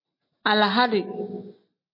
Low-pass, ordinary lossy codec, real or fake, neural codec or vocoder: 5.4 kHz; MP3, 32 kbps; real; none